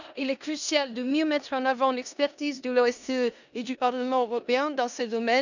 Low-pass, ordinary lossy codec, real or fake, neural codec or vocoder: 7.2 kHz; none; fake; codec, 16 kHz in and 24 kHz out, 0.9 kbps, LongCat-Audio-Codec, four codebook decoder